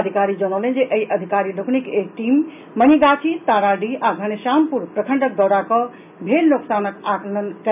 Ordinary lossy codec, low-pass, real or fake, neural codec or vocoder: none; 3.6 kHz; real; none